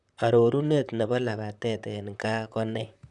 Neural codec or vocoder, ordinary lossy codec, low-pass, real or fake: vocoder, 44.1 kHz, 128 mel bands, Pupu-Vocoder; none; 10.8 kHz; fake